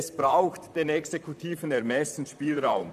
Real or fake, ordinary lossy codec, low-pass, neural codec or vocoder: fake; none; 14.4 kHz; vocoder, 44.1 kHz, 128 mel bands, Pupu-Vocoder